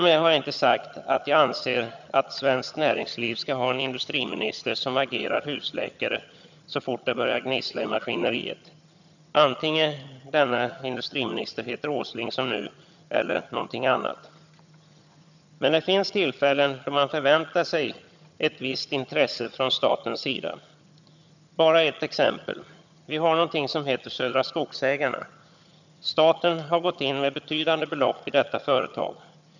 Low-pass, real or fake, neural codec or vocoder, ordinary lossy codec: 7.2 kHz; fake; vocoder, 22.05 kHz, 80 mel bands, HiFi-GAN; none